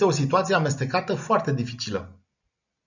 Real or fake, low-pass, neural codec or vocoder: real; 7.2 kHz; none